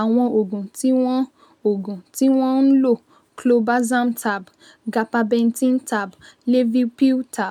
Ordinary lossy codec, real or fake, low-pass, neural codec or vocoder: none; real; 19.8 kHz; none